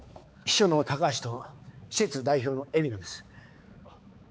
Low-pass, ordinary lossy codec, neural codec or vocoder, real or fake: none; none; codec, 16 kHz, 4 kbps, X-Codec, HuBERT features, trained on balanced general audio; fake